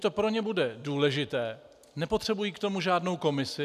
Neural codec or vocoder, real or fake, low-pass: none; real; 14.4 kHz